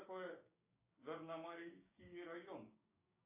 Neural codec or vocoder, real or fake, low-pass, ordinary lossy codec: codec, 16 kHz, 6 kbps, DAC; fake; 3.6 kHz; AAC, 32 kbps